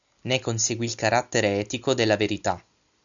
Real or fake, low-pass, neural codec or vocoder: real; 7.2 kHz; none